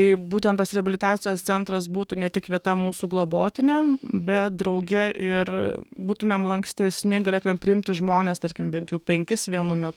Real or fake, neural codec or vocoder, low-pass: fake; codec, 44.1 kHz, 2.6 kbps, DAC; 19.8 kHz